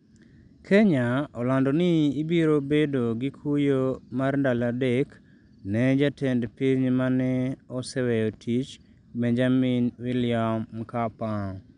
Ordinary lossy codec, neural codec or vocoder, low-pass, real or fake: none; none; 9.9 kHz; real